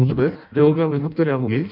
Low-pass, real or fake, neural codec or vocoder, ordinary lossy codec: 5.4 kHz; fake; codec, 16 kHz in and 24 kHz out, 0.6 kbps, FireRedTTS-2 codec; none